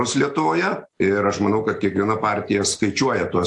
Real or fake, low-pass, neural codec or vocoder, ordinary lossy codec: real; 10.8 kHz; none; AAC, 64 kbps